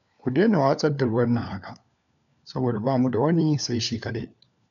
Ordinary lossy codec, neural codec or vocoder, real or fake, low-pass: none; codec, 16 kHz, 4 kbps, FunCodec, trained on LibriTTS, 50 frames a second; fake; 7.2 kHz